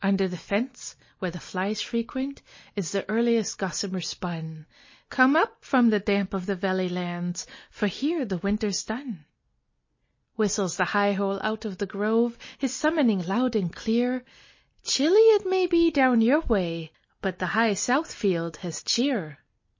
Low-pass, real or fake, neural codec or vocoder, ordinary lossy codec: 7.2 kHz; real; none; MP3, 32 kbps